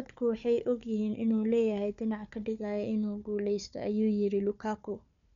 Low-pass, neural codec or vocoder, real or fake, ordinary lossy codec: 7.2 kHz; codec, 16 kHz, 4 kbps, FunCodec, trained on Chinese and English, 50 frames a second; fake; none